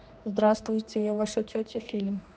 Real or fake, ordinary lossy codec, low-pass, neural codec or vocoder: fake; none; none; codec, 16 kHz, 2 kbps, X-Codec, HuBERT features, trained on general audio